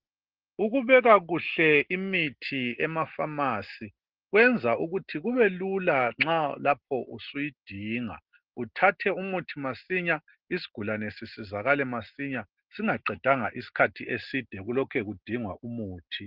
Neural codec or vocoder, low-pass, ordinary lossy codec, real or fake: none; 5.4 kHz; Opus, 16 kbps; real